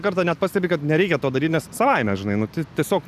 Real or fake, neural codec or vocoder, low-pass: real; none; 14.4 kHz